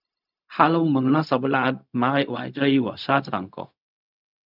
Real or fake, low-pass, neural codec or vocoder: fake; 5.4 kHz; codec, 16 kHz, 0.4 kbps, LongCat-Audio-Codec